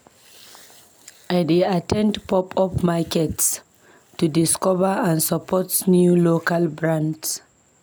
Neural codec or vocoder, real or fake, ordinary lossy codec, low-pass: none; real; none; none